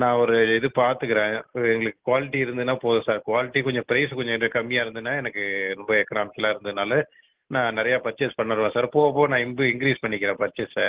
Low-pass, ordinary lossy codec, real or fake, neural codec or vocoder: 3.6 kHz; Opus, 16 kbps; real; none